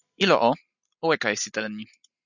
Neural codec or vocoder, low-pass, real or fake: none; 7.2 kHz; real